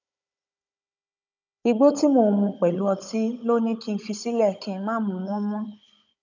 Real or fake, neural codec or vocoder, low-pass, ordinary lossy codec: fake; codec, 16 kHz, 16 kbps, FunCodec, trained on Chinese and English, 50 frames a second; 7.2 kHz; none